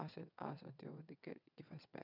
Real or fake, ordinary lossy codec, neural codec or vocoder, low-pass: fake; none; vocoder, 22.05 kHz, 80 mel bands, WaveNeXt; 5.4 kHz